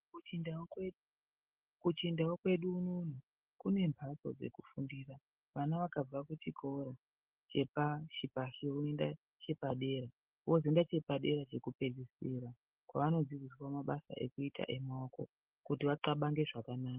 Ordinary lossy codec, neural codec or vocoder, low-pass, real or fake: Opus, 16 kbps; none; 3.6 kHz; real